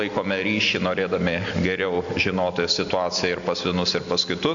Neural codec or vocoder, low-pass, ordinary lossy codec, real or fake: none; 7.2 kHz; AAC, 64 kbps; real